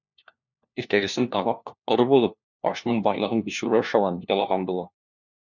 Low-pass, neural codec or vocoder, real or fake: 7.2 kHz; codec, 16 kHz, 1 kbps, FunCodec, trained on LibriTTS, 50 frames a second; fake